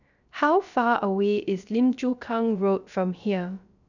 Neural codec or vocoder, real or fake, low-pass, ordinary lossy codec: codec, 16 kHz, 0.3 kbps, FocalCodec; fake; 7.2 kHz; none